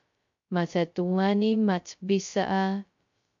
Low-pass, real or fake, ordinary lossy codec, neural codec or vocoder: 7.2 kHz; fake; MP3, 48 kbps; codec, 16 kHz, 0.2 kbps, FocalCodec